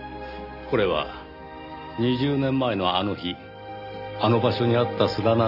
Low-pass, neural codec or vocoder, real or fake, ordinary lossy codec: 5.4 kHz; none; real; none